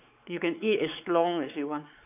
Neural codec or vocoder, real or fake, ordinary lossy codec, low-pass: codec, 16 kHz, 4 kbps, X-Codec, WavLM features, trained on Multilingual LibriSpeech; fake; none; 3.6 kHz